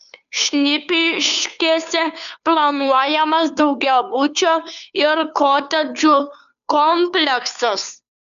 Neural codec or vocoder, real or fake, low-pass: codec, 16 kHz, 2 kbps, FunCodec, trained on Chinese and English, 25 frames a second; fake; 7.2 kHz